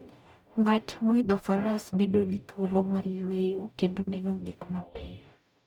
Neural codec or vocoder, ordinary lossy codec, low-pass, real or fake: codec, 44.1 kHz, 0.9 kbps, DAC; none; 19.8 kHz; fake